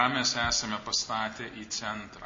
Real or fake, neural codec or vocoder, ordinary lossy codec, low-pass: real; none; MP3, 32 kbps; 7.2 kHz